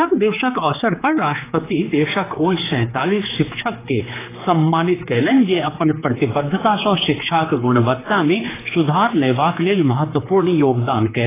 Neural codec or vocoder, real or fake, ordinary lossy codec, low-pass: codec, 16 kHz, 4 kbps, X-Codec, HuBERT features, trained on general audio; fake; AAC, 16 kbps; 3.6 kHz